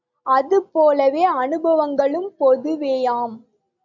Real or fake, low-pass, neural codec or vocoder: real; 7.2 kHz; none